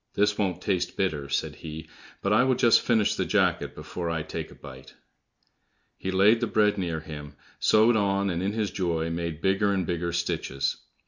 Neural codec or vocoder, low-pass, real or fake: none; 7.2 kHz; real